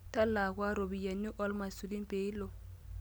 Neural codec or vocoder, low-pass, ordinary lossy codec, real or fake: none; none; none; real